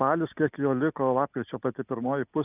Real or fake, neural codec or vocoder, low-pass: real; none; 3.6 kHz